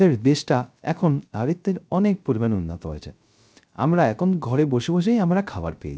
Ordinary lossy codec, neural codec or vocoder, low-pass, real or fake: none; codec, 16 kHz, 0.3 kbps, FocalCodec; none; fake